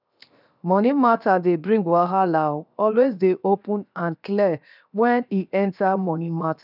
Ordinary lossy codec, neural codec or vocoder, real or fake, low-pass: none; codec, 16 kHz, 0.7 kbps, FocalCodec; fake; 5.4 kHz